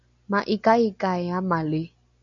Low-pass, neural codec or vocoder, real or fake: 7.2 kHz; none; real